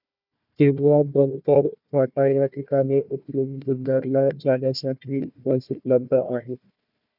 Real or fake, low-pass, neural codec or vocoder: fake; 5.4 kHz; codec, 16 kHz, 1 kbps, FunCodec, trained on Chinese and English, 50 frames a second